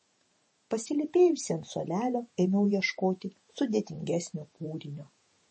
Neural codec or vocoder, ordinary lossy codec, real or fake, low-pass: vocoder, 48 kHz, 128 mel bands, Vocos; MP3, 32 kbps; fake; 10.8 kHz